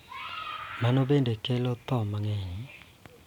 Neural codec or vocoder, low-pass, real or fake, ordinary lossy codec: none; 19.8 kHz; real; none